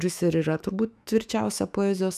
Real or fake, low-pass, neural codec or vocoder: fake; 14.4 kHz; autoencoder, 48 kHz, 128 numbers a frame, DAC-VAE, trained on Japanese speech